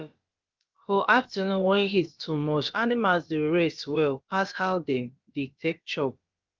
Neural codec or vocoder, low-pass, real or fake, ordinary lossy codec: codec, 16 kHz, about 1 kbps, DyCAST, with the encoder's durations; 7.2 kHz; fake; Opus, 32 kbps